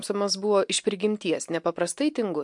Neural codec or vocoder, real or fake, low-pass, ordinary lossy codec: none; real; 10.8 kHz; MP3, 64 kbps